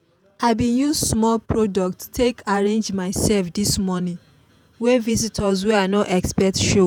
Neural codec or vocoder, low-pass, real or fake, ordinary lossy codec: vocoder, 48 kHz, 128 mel bands, Vocos; none; fake; none